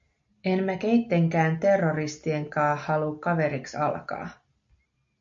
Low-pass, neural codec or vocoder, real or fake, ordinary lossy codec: 7.2 kHz; none; real; MP3, 48 kbps